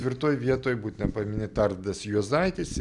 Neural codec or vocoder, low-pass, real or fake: none; 10.8 kHz; real